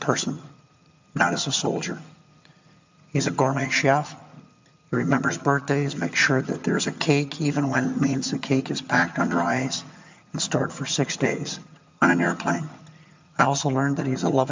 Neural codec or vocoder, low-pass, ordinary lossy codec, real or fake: vocoder, 22.05 kHz, 80 mel bands, HiFi-GAN; 7.2 kHz; MP3, 64 kbps; fake